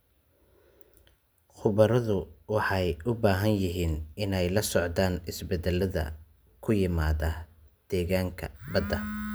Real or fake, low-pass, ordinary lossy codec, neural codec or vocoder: real; none; none; none